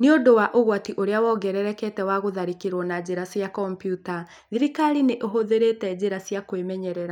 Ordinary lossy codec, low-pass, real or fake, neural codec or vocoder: none; 19.8 kHz; real; none